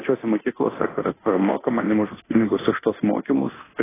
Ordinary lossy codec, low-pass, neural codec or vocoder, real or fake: AAC, 16 kbps; 3.6 kHz; codec, 24 kHz, 0.9 kbps, DualCodec; fake